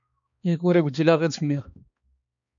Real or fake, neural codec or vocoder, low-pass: fake; codec, 16 kHz, 2 kbps, X-Codec, HuBERT features, trained on balanced general audio; 7.2 kHz